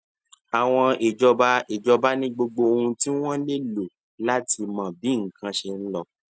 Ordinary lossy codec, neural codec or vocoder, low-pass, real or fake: none; none; none; real